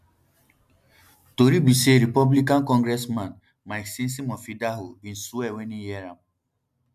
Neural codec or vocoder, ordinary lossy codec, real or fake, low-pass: none; MP3, 96 kbps; real; 14.4 kHz